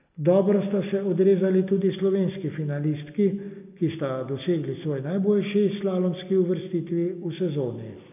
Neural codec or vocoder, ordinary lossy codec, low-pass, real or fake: none; none; 3.6 kHz; real